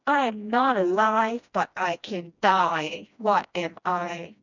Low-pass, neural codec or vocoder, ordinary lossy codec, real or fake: 7.2 kHz; codec, 16 kHz, 1 kbps, FreqCodec, smaller model; none; fake